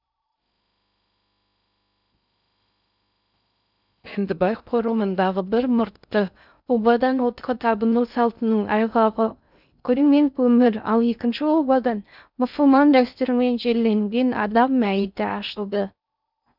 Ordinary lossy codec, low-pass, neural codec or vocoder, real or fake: none; 5.4 kHz; codec, 16 kHz in and 24 kHz out, 0.6 kbps, FocalCodec, streaming, 2048 codes; fake